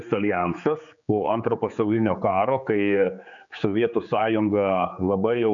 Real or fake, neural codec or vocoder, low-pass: fake; codec, 16 kHz, 4 kbps, X-Codec, HuBERT features, trained on general audio; 7.2 kHz